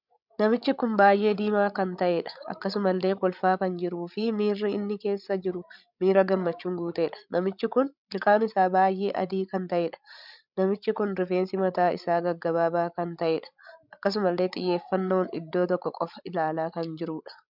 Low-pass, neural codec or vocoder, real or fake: 5.4 kHz; codec, 16 kHz, 16 kbps, FreqCodec, larger model; fake